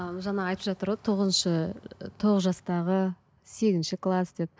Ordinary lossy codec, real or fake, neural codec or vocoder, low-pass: none; real; none; none